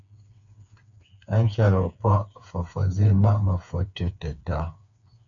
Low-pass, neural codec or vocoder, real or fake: 7.2 kHz; codec, 16 kHz, 4 kbps, FreqCodec, smaller model; fake